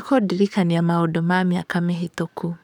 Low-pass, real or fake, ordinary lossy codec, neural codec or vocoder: 19.8 kHz; fake; none; codec, 44.1 kHz, 7.8 kbps, DAC